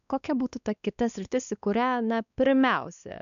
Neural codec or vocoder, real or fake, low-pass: codec, 16 kHz, 2 kbps, X-Codec, WavLM features, trained on Multilingual LibriSpeech; fake; 7.2 kHz